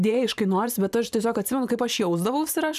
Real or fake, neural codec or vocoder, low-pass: fake; vocoder, 44.1 kHz, 128 mel bands every 512 samples, BigVGAN v2; 14.4 kHz